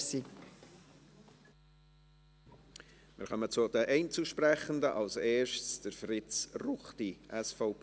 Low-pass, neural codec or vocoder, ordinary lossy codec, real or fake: none; none; none; real